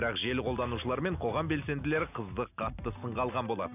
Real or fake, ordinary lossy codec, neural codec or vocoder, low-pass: real; none; none; 3.6 kHz